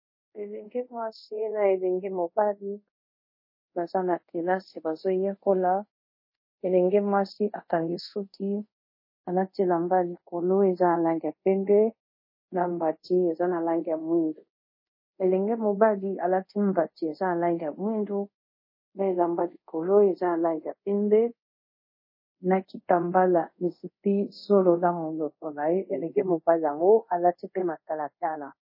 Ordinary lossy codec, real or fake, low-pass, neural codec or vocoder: MP3, 24 kbps; fake; 5.4 kHz; codec, 24 kHz, 0.5 kbps, DualCodec